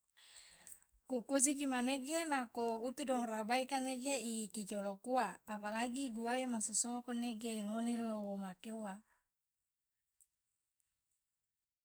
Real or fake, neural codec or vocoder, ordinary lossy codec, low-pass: fake; codec, 44.1 kHz, 2.6 kbps, SNAC; none; none